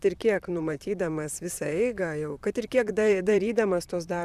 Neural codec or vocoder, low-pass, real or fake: vocoder, 44.1 kHz, 128 mel bands, Pupu-Vocoder; 14.4 kHz; fake